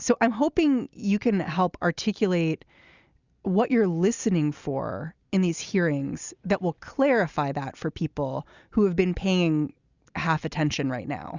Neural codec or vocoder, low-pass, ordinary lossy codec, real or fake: none; 7.2 kHz; Opus, 64 kbps; real